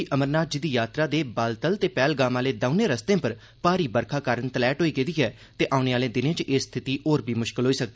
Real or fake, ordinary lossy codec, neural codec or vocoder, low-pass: real; none; none; none